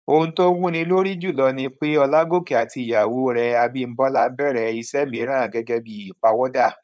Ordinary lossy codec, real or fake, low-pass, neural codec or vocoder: none; fake; none; codec, 16 kHz, 4.8 kbps, FACodec